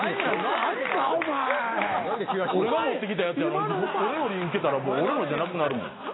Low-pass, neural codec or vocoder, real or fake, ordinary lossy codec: 7.2 kHz; none; real; AAC, 16 kbps